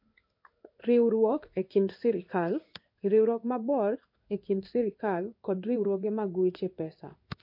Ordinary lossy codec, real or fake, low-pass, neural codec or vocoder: MP3, 32 kbps; fake; 5.4 kHz; codec, 16 kHz in and 24 kHz out, 1 kbps, XY-Tokenizer